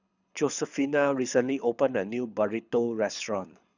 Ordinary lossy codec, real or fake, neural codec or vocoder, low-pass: none; fake; codec, 24 kHz, 6 kbps, HILCodec; 7.2 kHz